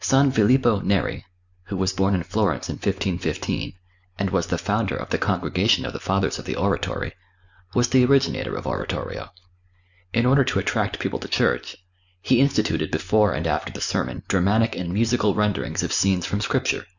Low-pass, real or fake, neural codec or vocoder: 7.2 kHz; real; none